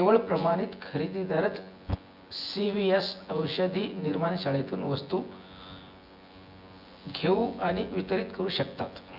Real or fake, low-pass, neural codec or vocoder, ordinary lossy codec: fake; 5.4 kHz; vocoder, 24 kHz, 100 mel bands, Vocos; Opus, 64 kbps